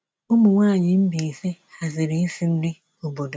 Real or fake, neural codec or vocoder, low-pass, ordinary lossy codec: real; none; none; none